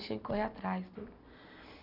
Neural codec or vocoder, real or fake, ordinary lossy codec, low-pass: none; real; none; 5.4 kHz